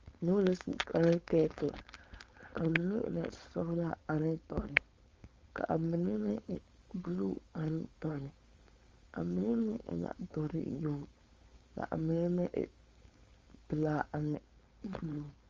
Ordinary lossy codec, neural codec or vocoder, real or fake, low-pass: Opus, 32 kbps; codec, 16 kHz, 4.8 kbps, FACodec; fake; 7.2 kHz